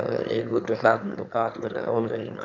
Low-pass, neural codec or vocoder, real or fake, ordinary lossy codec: 7.2 kHz; autoencoder, 22.05 kHz, a latent of 192 numbers a frame, VITS, trained on one speaker; fake; none